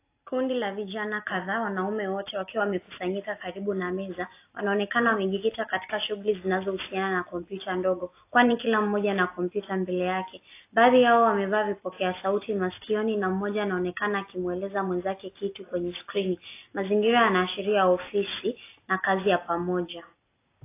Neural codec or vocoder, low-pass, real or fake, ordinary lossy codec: none; 3.6 kHz; real; AAC, 24 kbps